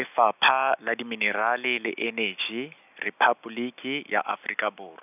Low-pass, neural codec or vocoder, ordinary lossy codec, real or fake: 3.6 kHz; none; none; real